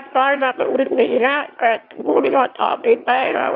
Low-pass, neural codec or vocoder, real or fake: 5.4 kHz; autoencoder, 22.05 kHz, a latent of 192 numbers a frame, VITS, trained on one speaker; fake